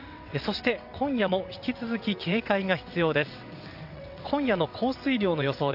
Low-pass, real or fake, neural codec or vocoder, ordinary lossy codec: 5.4 kHz; fake; vocoder, 22.05 kHz, 80 mel bands, WaveNeXt; AAC, 48 kbps